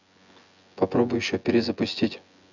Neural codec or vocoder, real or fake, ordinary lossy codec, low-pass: vocoder, 24 kHz, 100 mel bands, Vocos; fake; none; 7.2 kHz